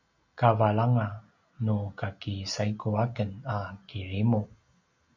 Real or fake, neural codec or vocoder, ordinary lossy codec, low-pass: real; none; MP3, 64 kbps; 7.2 kHz